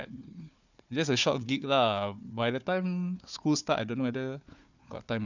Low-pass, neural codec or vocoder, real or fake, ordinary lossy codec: 7.2 kHz; codec, 16 kHz, 4 kbps, FunCodec, trained on Chinese and English, 50 frames a second; fake; none